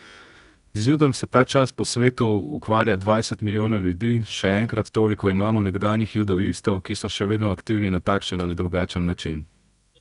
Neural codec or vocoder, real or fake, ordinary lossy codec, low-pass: codec, 24 kHz, 0.9 kbps, WavTokenizer, medium music audio release; fake; none; 10.8 kHz